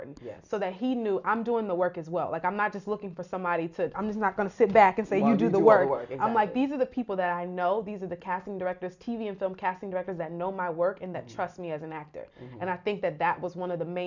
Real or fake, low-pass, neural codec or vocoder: real; 7.2 kHz; none